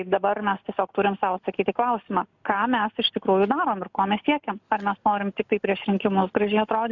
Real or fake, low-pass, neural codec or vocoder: real; 7.2 kHz; none